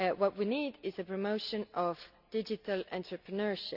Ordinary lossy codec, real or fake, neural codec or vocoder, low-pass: none; real; none; 5.4 kHz